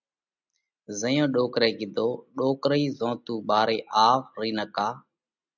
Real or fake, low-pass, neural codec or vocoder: real; 7.2 kHz; none